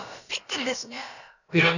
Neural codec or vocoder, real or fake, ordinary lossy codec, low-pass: codec, 16 kHz, about 1 kbps, DyCAST, with the encoder's durations; fake; none; 7.2 kHz